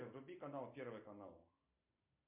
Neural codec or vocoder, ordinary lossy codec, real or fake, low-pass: none; MP3, 32 kbps; real; 3.6 kHz